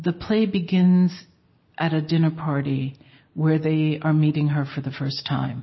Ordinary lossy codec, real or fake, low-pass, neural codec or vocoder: MP3, 24 kbps; real; 7.2 kHz; none